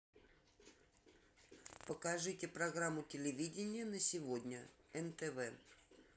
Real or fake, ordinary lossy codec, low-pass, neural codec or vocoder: real; none; none; none